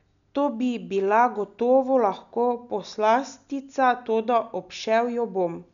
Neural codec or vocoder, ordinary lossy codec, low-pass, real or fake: none; none; 7.2 kHz; real